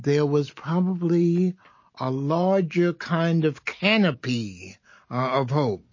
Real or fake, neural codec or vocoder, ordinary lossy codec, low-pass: real; none; MP3, 32 kbps; 7.2 kHz